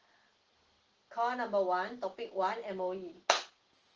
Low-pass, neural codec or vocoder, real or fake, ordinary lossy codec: 7.2 kHz; none; real; Opus, 16 kbps